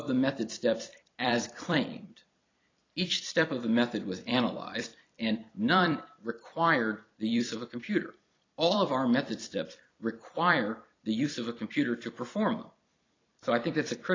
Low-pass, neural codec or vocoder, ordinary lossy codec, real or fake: 7.2 kHz; vocoder, 44.1 kHz, 128 mel bands every 256 samples, BigVGAN v2; AAC, 32 kbps; fake